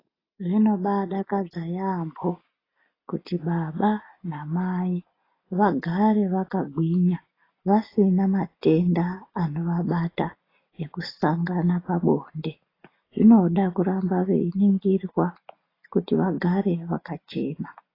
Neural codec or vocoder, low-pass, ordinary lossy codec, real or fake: none; 5.4 kHz; AAC, 24 kbps; real